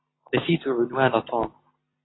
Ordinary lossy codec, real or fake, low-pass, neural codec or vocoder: AAC, 16 kbps; real; 7.2 kHz; none